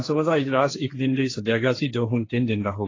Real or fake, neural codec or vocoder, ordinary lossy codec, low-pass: fake; codec, 16 kHz, 1.1 kbps, Voila-Tokenizer; AAC, 32 kbps; 7.2 kHz